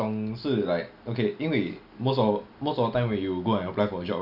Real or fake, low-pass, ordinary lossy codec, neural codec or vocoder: real; 5.4 kHz; none; none